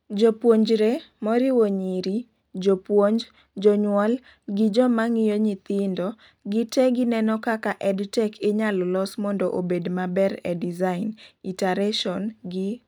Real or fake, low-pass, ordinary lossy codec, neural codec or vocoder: real; 19.8 kHz; none; none